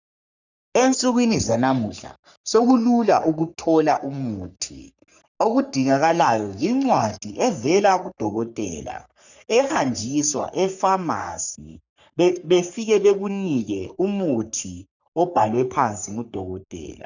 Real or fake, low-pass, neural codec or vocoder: fake; 7.2 kHz; codec, 44.1 kHz, 3.4 kbps, Pupu-Codec